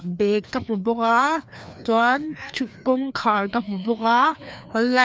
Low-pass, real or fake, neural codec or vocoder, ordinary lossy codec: none; fake; codec, 16 kHz, 2 kbps, FreqCodec, larger model; none